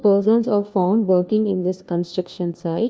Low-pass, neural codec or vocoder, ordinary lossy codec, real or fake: none; codec, 16 kHz, 1 kbps, FunCodec, trained on LibriTTS, 50 frames a second; none; fake